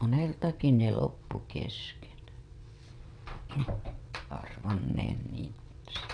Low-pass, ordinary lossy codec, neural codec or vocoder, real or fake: 9.9 kHz; none; vocoder, 22.05 kHz, 80 mel bands, WaveNeXt; fake